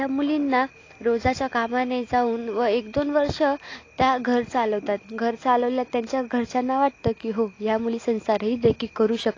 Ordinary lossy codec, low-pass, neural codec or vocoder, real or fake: AAC, 32 kbps; 7.2 kHz; none; real